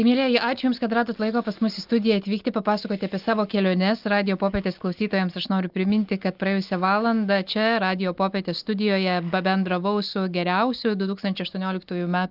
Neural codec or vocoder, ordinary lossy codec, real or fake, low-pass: none; Opus, 32 kbps; real; 5.4 kHz